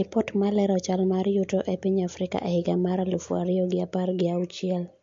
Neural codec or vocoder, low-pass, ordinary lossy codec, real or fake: none; 7.2 kHz; MP3, 48 kbps; real